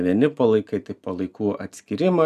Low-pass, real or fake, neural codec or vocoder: 14.4 kHz; real; none